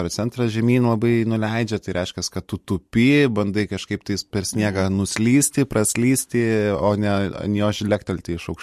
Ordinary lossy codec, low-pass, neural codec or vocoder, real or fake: MP3, 64 kbps; 14.4 kHz; none; real